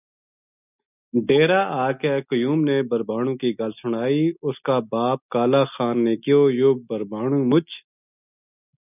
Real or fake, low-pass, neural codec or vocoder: real; 3.6 kHz; none